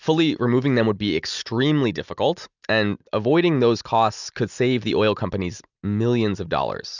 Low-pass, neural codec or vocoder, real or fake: 7.2 kHz; none; real